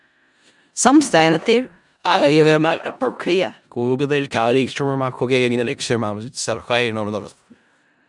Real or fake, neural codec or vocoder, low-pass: fake; codec, 16 kHz in and 24 kHz out, 0.4 kbps, LongCat-Audio-Codec, four codebook decoder; 10.8 kHz